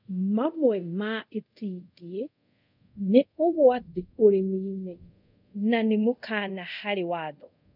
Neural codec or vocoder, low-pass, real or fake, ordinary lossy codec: codec, 24 kHz, 0.5 kbps, DualCodec; 5.4 kHz; fake; none